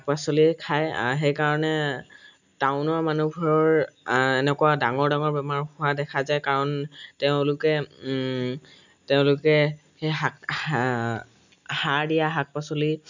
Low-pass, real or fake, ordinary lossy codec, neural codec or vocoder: 7.2 kHz; real; none; none